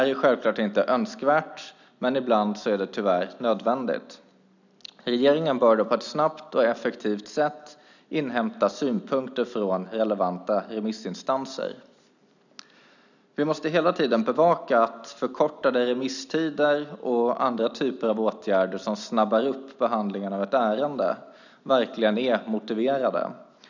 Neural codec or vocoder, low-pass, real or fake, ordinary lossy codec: none; 7.2 kHz; real; none